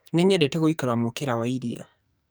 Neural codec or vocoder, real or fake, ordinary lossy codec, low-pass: codec, 44.1 kHz, 2.6 kbps, SNAC; fake; none; none